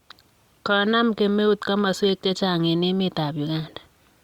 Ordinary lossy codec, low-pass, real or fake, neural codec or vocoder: Opus, 64 kbps; 19.8 kHz; real; none